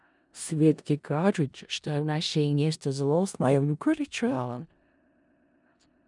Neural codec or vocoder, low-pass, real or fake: codec, 16 kHz in and 24 kHz out, 0.4 kbps, LongCat-Audio-Codec, four codebook decoder; 10.8 kHz; fake